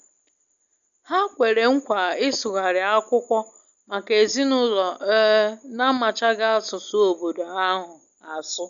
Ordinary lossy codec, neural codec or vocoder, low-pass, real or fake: none; none; 7.2 kHz; real